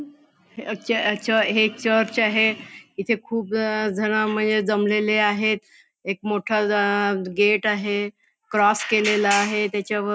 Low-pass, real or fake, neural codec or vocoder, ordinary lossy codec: none; real; none; none